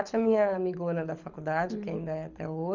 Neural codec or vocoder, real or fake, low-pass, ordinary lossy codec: codec, 24 kHz, 6 kbps, HILCodec; fake; 7.2 kHz; none